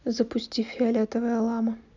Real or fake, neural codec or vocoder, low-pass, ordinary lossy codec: real; none; 7.2 kHz; none